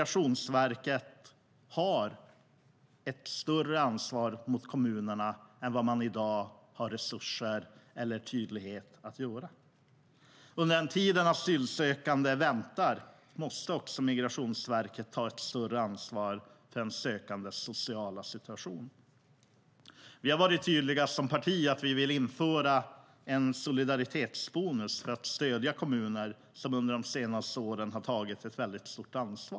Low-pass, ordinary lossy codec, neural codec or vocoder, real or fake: none; none; none; real